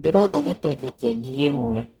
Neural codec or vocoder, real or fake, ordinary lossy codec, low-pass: codec, 44.1 kHz, 0.9 kbps, DAC; fake; none; 19.8 kHz